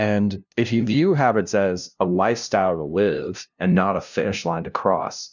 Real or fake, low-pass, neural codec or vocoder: fake; 7.2 kHz; codec, 16 kHz, 0.5 kbps, FunCodec, trained on LibriTTS, 25 frames a second